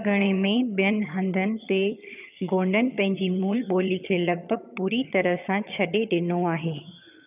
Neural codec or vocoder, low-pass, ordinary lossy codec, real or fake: vocoder, 22.05 kHz, 80 mel bands, HiFi-GAN; 3.6 kHz; none; fake